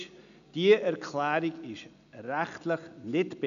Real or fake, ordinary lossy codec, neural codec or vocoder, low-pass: real; none; none; 7.2 kHz